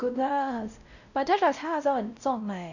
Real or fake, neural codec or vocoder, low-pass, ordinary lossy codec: fake; codec, 16 kHz, 0.5 kbps, X-Codec, WavLM features, trained on Multilingual LibriSpeech; 7.2 kHz; none